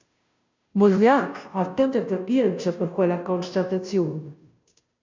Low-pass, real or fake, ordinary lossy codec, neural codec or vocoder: 7.2 kHz; fake; MP3, 64 kbps; codec, 16 kHz, 0.5 kbps, FunCodec, trained on Chinese and English, 25 frames a second